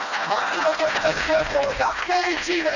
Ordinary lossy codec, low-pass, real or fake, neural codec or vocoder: none; 7.2 kHz; fake; codec, 16 kHz, 1 kbps, FreqCodec, smaller model